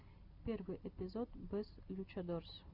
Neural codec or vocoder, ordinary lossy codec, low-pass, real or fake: none; Opus, 64 kbps; 5.4 kHz; real